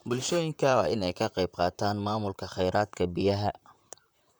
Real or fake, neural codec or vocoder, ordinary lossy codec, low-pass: fake; vocoder, 44.1 kHz, 128 mel bands, Pupu-Vocoder; none; none